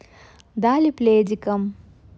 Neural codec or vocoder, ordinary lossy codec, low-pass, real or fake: none; none; none; real